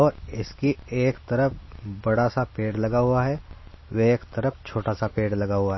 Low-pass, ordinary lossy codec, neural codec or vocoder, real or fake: 7.2 kHz; MP3, 24 kbps; none; real